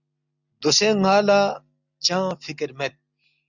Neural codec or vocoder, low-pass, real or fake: none; 7.2 kHz; real